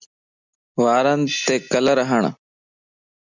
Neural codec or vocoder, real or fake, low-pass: none; real; 7.2 kHz